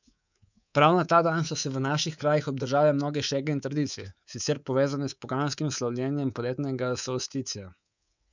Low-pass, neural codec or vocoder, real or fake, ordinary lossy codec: 7.2 kHz; codec, 24 kHz, 3.1 kbps, DualCodec; fake; none